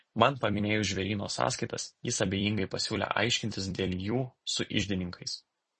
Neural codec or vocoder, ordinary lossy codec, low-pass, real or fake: vocoder, 44.1 kHz, 128 mel bands every 256 samples, BigVGAN v2; MP3, 32 kbps; 10.8 kHz; fake